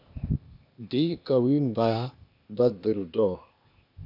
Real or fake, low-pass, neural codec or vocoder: fake; 5.4 kHz; codec, 16 kHz, 0.8 kbps, ZipCodec